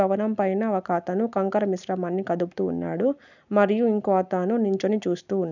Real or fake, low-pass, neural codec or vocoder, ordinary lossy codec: real; 7.2 kHz; none; none